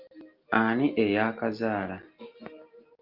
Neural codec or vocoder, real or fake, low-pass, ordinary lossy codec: none; real; 5.4 kHz; Opus, 24 kbps